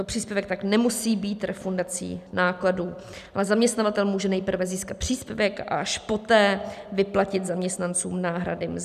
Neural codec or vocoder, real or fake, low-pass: none; real; 14.4 kHz